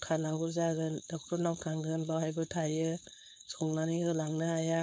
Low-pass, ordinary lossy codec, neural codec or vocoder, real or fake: none; none; codec, 16 kHz, 4.8 kbps, FACodec; fake